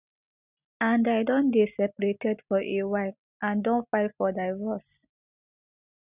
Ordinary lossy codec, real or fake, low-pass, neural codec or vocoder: none; real; 3.6 kHz; none